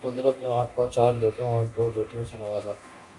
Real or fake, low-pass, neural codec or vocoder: fake; 10.8 kHz; codec, 24 kHz, 0.9 kbps, DualCodec